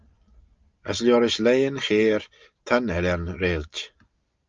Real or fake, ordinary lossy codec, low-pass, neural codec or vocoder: real; Opus, 24 kbps; 7.2 kHz; none